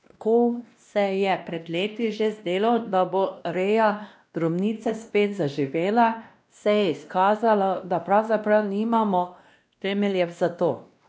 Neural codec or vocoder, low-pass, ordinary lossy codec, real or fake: codec, 16 kHz, 1 kbps, X-Codec, WavLM features, trained on Multilingual LibriSpeech; none; none; fake